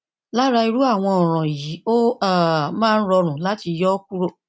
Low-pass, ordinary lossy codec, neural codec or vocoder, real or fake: none; none; none; real